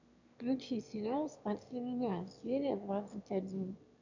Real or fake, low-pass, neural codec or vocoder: fake; 7.2 kHz; autoencoder, 22.05 kHz, a latent of 192 numbers a frame, VITS, trained on one speaker